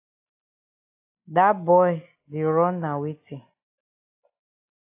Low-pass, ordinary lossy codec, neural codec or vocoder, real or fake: 3.6 kHz; AAC, 24 kbps; none; real